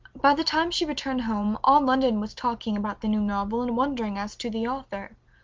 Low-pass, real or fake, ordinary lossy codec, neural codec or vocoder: 7.2 kHz; real; Opus, 24 kbps; none